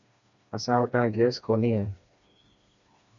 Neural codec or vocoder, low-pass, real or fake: codec, 16 kHz, 2 kbps, FreqCodec, smaller model; 7.2 kHz; fake